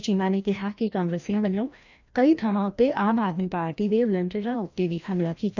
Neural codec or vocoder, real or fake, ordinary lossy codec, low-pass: codec, 16 kHz, 1 kbps, FreqCodec, larger model; fake; none; 7.2 kHz